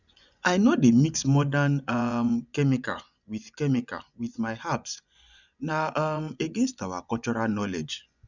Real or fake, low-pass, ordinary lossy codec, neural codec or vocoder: fake; 7.2 kHz; none; vocoder, 24 kHz, 100 mel bands, Vocos